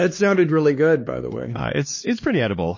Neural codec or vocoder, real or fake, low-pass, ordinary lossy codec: codec, 16 kHz, 2 kbps, X-Codec, HuBERT features, trained on LibriSpeech; fake; 7.2 kHz; MP3, 32 kbps